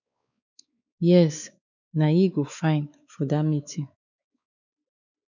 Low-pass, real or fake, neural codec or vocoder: 7.2 kHz; fake; codec, 16 kHz, 4 kbps, X-Codec, WavLM features, trained on Multilingual LibriSpeech